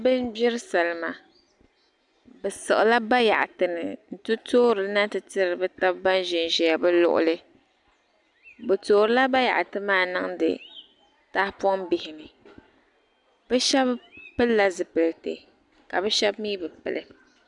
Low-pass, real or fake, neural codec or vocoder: 10.8 kHz; real; none